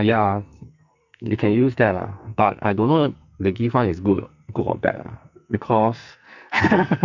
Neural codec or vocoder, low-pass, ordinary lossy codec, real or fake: codec, 44.1 kHz, 2.6 kbps, SNAC; 7.2 kHz; none; fake